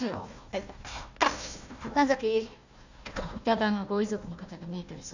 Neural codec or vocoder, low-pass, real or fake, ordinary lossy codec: codec, 16 kHz, 1 kbps, FunCodec, trained on Chinese and English, 50 frames a second; 7.2 kHz; fake; none